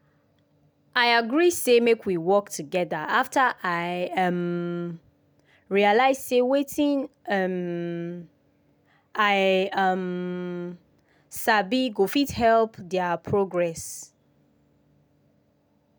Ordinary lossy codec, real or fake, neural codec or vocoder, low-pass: none; real; none; none